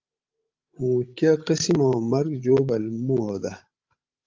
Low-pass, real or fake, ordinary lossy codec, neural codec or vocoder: 7.2 kHz; fake; Opus, 32 kbps; codec, 16 kHz, 16 kbps, FreqCodec, larger model